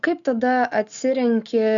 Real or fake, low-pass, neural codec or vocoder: real; 7.2 kHz; none